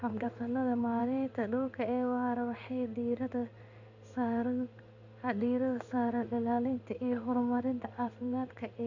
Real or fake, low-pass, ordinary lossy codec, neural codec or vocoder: fake; 7.2 kHz; none; codec, 16 kHz in and 24 kHz out, 1 kbps, XY-Tokenizer